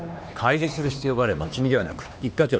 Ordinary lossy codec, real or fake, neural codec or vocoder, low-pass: none; fake; codec, 16 kHz, 2 kbps, X-Codec, HuBERT features, trained on LibriSpeech; none